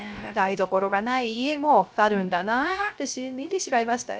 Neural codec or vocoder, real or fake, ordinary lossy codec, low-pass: codec, 16 kHz, 0.3 kbps, FocalCodec; fake; none; none